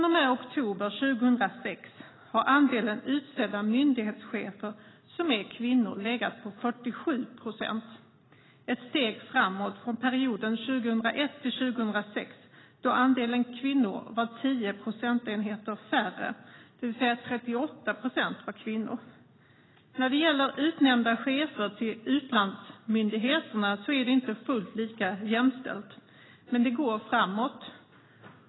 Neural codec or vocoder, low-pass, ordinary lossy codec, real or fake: none; 7.2 kHz; AAC, 16 kbps; real